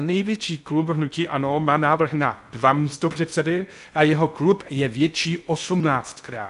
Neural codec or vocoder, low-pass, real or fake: codec, 16 kHz in and 24 kHz out, 0.6 kbps, FocalCodec, streaming, 2048 codes; 10.8 kHz; fake